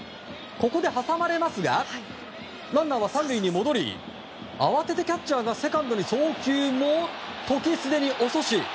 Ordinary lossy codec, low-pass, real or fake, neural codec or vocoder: none; none; real; none